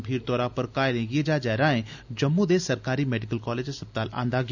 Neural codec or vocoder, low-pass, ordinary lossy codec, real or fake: none; 7.2 kHz; none; real